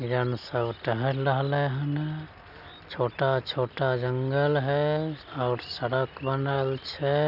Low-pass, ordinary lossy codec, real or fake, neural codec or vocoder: 5.4 kHz; none; real; none